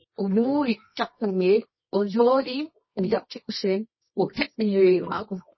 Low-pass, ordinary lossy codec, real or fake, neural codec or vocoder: 7.2 kHz; MP3, 24 kbps; fake; codec, 24 kHz, 0.9 kbps, WavTokenizer, medium music audio release